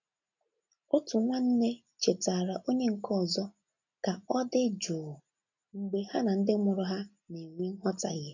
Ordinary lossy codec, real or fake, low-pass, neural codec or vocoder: none; real; 7.2 kHz; none